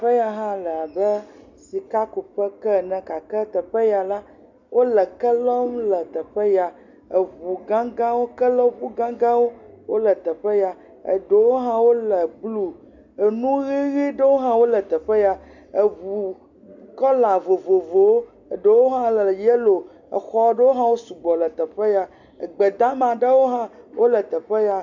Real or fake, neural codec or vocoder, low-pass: real; none; 7.2 kHz